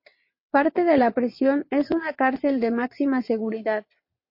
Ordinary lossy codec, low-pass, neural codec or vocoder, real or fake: MP3, 32 kbps; 5.4 kHz; vocoder, 22.05 kHz, 80 mel bands, WaveNeXt; fake